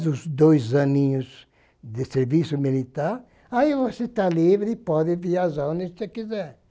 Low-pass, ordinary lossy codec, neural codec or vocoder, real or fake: none; none; none; real